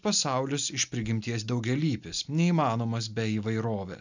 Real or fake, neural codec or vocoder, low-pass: real; none; 7.2 kHz